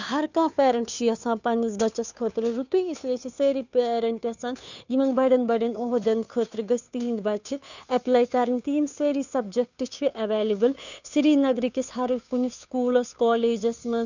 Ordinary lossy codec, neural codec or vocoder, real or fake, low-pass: none; codec, 16 kHz, 2 kbps, FunCodec, trained on Chinese and English, 25 frames a second; fake; 7.2 kHz